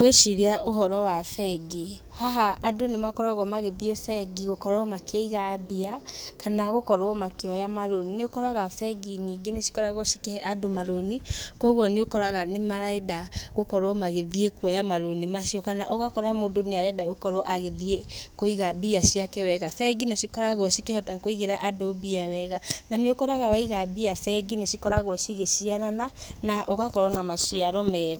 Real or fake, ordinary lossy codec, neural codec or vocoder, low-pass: fake; none; codec, 44.1 kHz, 2.6 kbps, SNAC; none